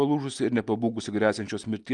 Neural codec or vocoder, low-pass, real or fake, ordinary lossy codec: none; 10.8 kHz; real; Opus, 32 kbps